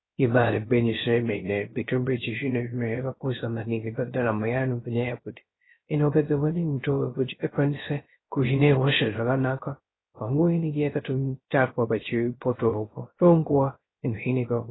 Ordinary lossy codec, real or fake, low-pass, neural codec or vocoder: AAC, 16 kbps; fake; 7.2 kHz; codec, 16 kHz, 0.3 kbps, FocalCodec